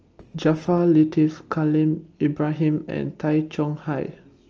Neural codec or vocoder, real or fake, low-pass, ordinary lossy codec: none; real; 7.2 kHz; Opus, 24 kbps